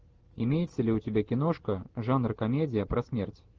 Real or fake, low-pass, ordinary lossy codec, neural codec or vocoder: real; 7.2 kHz; Opus, 16 kbps; none